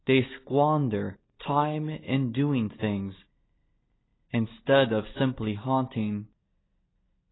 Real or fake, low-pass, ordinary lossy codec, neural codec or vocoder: real; 7.2 kHz; AAC, 16 kbps; none